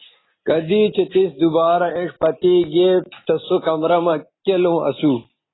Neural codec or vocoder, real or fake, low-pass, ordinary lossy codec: none; real; 7.2 kHz; AAC, 16 kbps